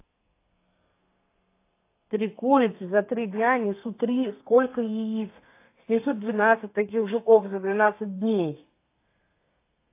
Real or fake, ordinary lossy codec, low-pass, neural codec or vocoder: fake; AAC, 24 kbps; 3.6 kHz; codec, 32 kHz, 1.9 kbps, SNAC